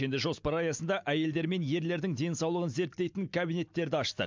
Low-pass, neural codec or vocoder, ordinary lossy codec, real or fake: 7.2 kHz; none; MP3, 64 kbps; real